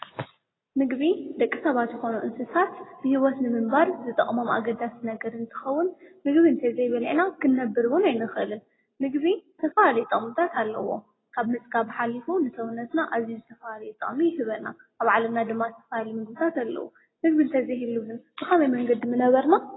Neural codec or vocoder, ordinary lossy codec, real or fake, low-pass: none; AAC, 16 kbps; real; 7.2 kHz